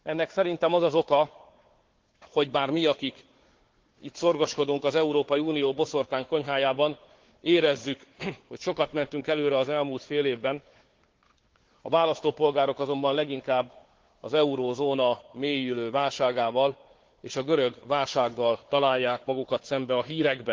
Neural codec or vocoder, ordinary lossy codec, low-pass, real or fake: codec, 16 kHz, 4 kbps, FunCodec, trained on Chinese and English, 50 frames a second; Opus, 16 kbps; 7.2 kHz; fake